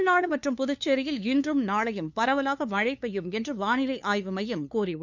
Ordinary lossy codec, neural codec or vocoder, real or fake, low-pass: none; codec, 16 kHz, 2 kbps, FunCodec, trained on LibriTTS, 25 frames a second; fake; 7.2 kHz